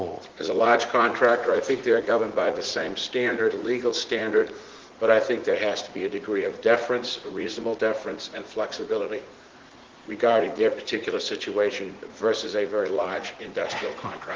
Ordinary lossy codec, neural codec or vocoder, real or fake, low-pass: Opus, 16 kbps; vocoder, 44.1 kHz, 80 mel bands, Vocos; fake; 7.2 kHz